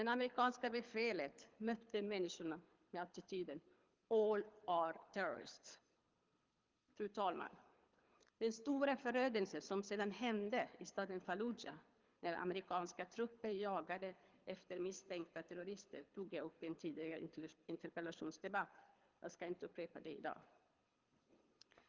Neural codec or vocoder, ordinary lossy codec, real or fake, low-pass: codec, 24 kHz, 6 kbps, HILCodec; Opus, 32 kbps; fake; 7.2 kHz